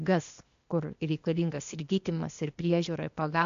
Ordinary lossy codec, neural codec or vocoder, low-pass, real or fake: MP3, 64 kbps; codec, 16 kHz, 0.8 kbps, ZipCodec; 7.2 kHz; fake